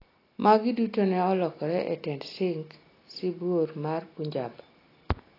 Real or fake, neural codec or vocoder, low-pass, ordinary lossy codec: real; none; 5.4 kHz; AAC, 24 kbps